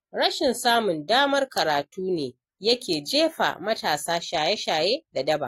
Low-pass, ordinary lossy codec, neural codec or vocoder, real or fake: 19.8 kHz; AAC, 48 kbps; none; real